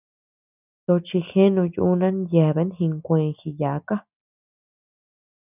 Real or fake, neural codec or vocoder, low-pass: real; none; 3.6 kHz